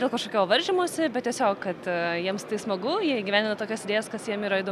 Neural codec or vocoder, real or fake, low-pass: none; real; 14.4 kHz